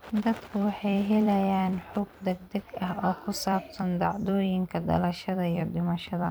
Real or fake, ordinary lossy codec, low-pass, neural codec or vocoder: fake; none; none; vocoder, 44.1 kHz, 128 mel bands every 256 samples, BigVGAN v2